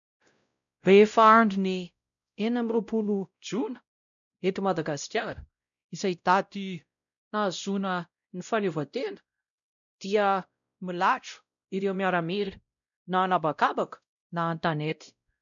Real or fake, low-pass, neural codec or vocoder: fake; 7.2 kHz; codec, 16 kHz, 0.5 kbps, X-Codec, WavLM features, trained on Multilingual LibriSpeech